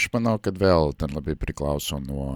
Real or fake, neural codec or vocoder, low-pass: real; none; 19.8 kHz